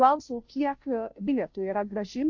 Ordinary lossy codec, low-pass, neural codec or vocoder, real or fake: MP3, 48 kbps; 7.2 kHz; codec, 16 kHz, 0.5 kbps, FunCodec, trained on Chinese and English, 25 frames a second; fake